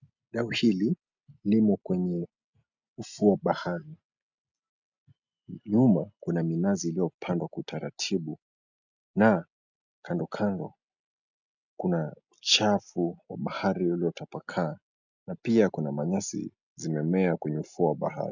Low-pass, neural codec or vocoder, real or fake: 7.2 kHz; none; real